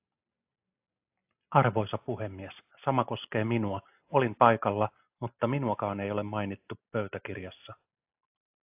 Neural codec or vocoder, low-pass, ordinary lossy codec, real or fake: none; 3.6 kHz; AAC, 32 kbps; real